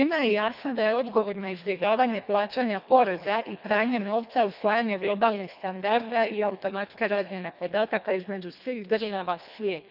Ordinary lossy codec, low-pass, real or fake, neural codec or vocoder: none; 5.4 kHz; fake; codec, 24 kHz, 1.5 kbps, HILCodec